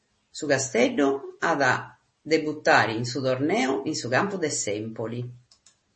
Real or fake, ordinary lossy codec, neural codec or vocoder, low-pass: real; MP3, 32 kbps; none; 10.8 kHz